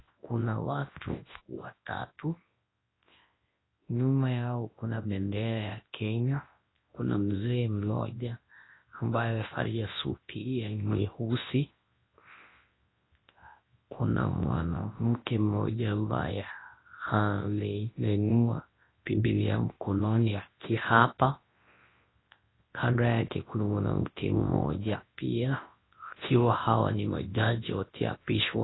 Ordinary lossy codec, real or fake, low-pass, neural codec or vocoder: AAC, 16 kbps; fake; 7.2 kHz; codec, 24 kHz, 0.9 kbps, WavTokenizer, large speech release